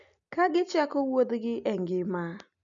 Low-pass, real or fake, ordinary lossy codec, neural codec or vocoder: 7.2 kHz; real; none; none